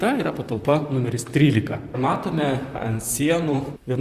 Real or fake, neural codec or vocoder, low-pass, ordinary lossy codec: fake; vocoder, 44.1 kHz, 128 mel bands, Pupu-Vocoder; 14.4 kHz; Opus, 64 kbps